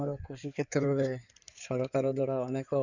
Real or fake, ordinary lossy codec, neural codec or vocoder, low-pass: fake; none; codec, 16 kHz in and 24 kHz out, 2.2 kbps, FireRedTTS-2 codec; 7.2 kHz